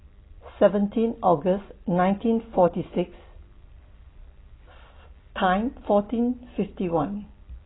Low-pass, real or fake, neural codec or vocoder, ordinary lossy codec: 7.2 kHz; real; none; AAC, 16 kbps